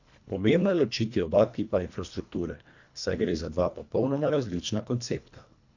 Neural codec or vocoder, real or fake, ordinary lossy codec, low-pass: codec, 24 kHz, 1.5 kbps, HILCodec; fake; none; 7.2 kHz